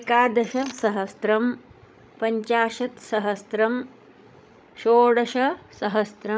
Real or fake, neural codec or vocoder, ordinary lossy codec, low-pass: fake; codec, 16 kHz, 16 kbps, FreqCodec, larger model; none; none